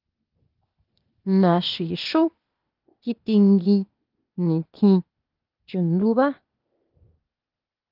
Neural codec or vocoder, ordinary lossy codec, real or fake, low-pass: codec, 16 kHz, 0.8 kbps, ZipCodec; Opus, 32 kbps; fake; 5.4 kHz